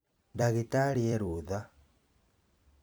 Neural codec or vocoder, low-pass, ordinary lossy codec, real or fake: vocoder, 44.1 kHz, 128 mel bands every 256 samples, BigVGAN v2; none; none; fake